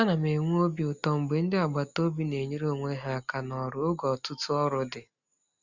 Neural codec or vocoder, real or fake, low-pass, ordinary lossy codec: none; real; 7.2 kHz; Opus, 64 kbps